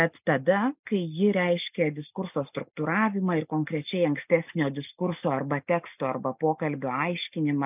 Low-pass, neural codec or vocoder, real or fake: 3.6 kHz; none; real